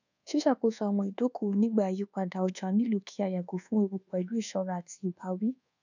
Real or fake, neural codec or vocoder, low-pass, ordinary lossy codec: fake; codec, 24 kHz, 1.2 kbps, DualCodec; 7.2 kHz; none